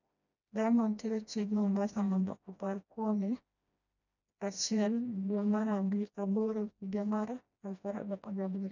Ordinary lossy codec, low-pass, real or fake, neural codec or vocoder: none; 7.2 kHz; fake; codec, 16 kHz, 1 kbps, FreqCodec, smaller model